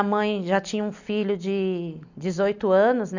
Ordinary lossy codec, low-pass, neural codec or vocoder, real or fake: none; 7.2 kHz; none; real